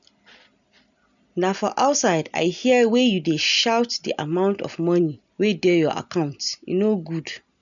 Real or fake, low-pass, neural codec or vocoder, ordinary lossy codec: real; 7.2 kHz; none; none